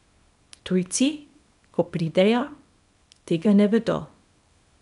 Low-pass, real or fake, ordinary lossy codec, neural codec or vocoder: 10.8 kHz; fake; none; codec, 24 kHz, 0.9 kbps, WavTokenizer, small release